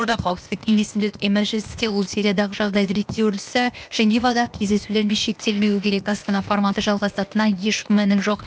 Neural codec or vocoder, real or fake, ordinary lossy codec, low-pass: codec, 16 kHz, 0.8 kbps, ZipCodec; fake; none; none